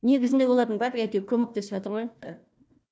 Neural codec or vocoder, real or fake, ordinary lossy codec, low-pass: codec, 16 kHz, 1 kbps, FunCodec, trained on Chinese and English, 50 frames a second; fake; none; none